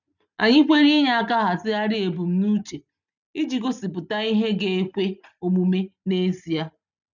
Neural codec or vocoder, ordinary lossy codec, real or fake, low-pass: none; none; real; 7.2 kHz